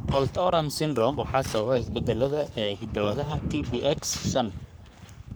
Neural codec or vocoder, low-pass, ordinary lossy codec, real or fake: codec, 44.1 kHz, 3.4 kbps, Pupu-Codec; none; none; fake